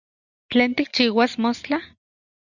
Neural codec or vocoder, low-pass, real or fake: none; 7.2 kHz; real